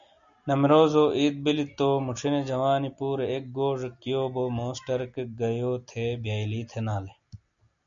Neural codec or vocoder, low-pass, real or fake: none; 7.2 kHz; real